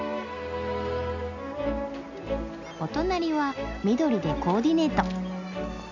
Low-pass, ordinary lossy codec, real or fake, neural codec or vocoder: 7.2 kHz; none; real; none